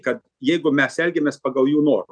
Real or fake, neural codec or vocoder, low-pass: real; none; 9.9 kHz